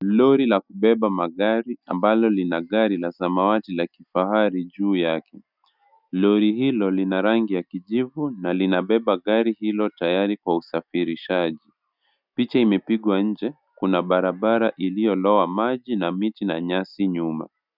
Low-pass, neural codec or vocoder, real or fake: 5.4 kHz; none; real